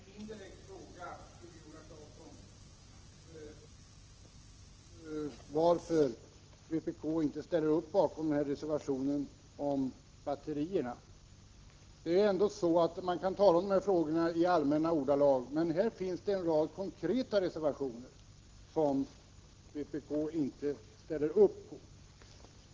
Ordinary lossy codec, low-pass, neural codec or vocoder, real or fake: Opus, 16 kbps; 7.2 kHz; none; real